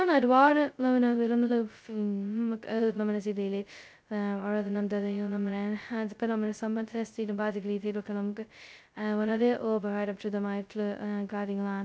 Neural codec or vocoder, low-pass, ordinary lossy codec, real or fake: codec, 16 kHz, 0.2 kbps, FocalCodec; none; none; fake